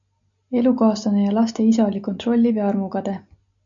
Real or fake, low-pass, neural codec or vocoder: real; 7.2 kHz; none